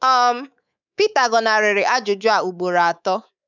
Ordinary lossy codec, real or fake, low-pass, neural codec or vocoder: none; fake; 7.2 kHz; codec, 24 kHz, 3.1 kbps, DualCodec